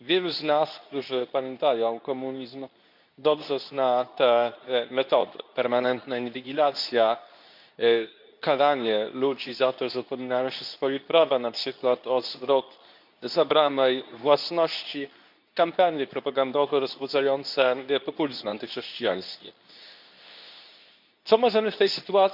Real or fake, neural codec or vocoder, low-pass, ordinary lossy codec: fake; codec, 24 kHz, 0.9 kbps, WavTokenizer, medium speech release version 2; 5.4 kHz; none